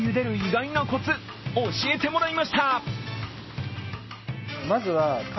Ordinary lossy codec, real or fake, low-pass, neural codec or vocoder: MP3, 24 kbps; real; 7.2 kHz; none